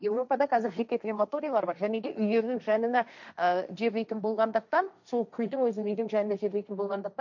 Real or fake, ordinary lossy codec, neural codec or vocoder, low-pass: fake; none; codec, 16 kHz, 1.1 kbps, Voila-Tokenizer; 7.2 kHz